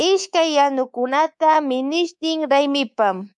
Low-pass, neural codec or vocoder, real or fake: 10.8 kHz; codec, 24 kHz, 3.1 kbps, DualCodec; fake